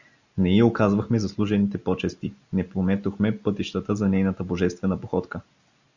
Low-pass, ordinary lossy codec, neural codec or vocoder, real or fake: 7.2 kHz; Opus, 64 kbps; none; real